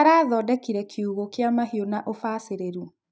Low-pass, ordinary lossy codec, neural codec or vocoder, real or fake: none; none; none; real